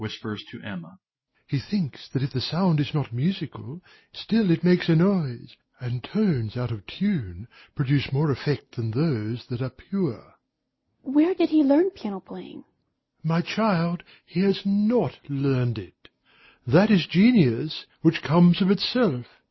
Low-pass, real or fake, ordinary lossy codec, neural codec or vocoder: 7.2 kHz; real; MP3, 24 kbps; none